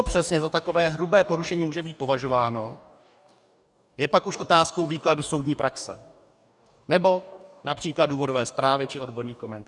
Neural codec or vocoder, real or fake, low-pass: codec, 44.1 kHz, 2.6 kbps, DAC; fake; 10.8 kHz